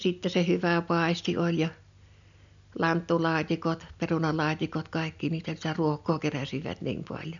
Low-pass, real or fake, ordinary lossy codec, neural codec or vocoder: 7.2 kHz; real; none; none